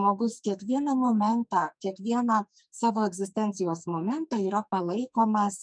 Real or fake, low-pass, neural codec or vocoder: fake; 9.9 kHz; codec, 44.1 kHz, 2.6 kbps, SNAC